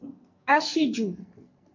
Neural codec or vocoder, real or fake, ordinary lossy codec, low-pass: codec, 44.1 kHz, 2.6 kbps, SNAC; fake; MP3, 48 kbps; 7.2 kHz